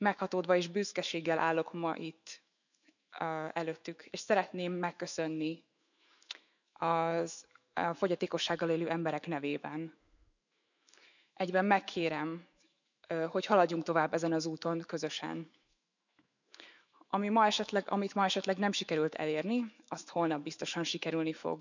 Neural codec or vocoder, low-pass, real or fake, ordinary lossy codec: autoencoder, 48 kHz, 128 numbers a frame, DAC-VAE, trained on Japanese speech; 7.2 kHz; fake; none